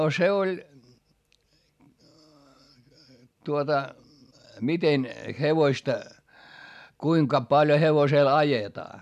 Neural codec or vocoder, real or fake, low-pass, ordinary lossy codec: none; real; 14.4 kHz; none